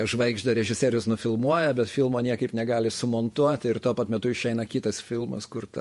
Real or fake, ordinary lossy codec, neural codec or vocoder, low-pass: fake; MP3, 48 kbps; vocoder, 48 kHz, 128 mel bands, Vocos; 14.4 kHz